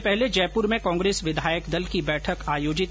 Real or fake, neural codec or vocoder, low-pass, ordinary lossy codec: real; none; none; none